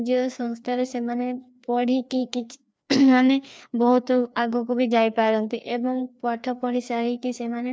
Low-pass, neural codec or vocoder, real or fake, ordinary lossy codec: none; codec, 16 kHz, 2 kbps, FreqCodec, larger model; fake; none